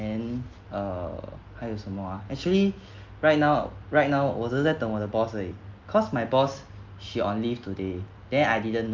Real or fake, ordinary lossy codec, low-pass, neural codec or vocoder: real; Opus, 24 kbps; 7.2 kHz; none